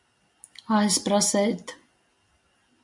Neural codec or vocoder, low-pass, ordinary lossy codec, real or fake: none; 10.8 kHz; MP3, 64 kbps; real